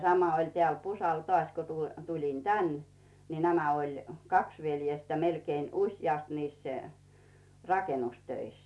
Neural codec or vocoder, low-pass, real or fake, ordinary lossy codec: none; none; real; none